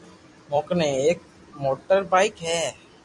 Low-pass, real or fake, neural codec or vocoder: 10.8 kHz; fake; vocoder, 44.1 kHz, 128 mel bands every 256 samples, BigVGAN v2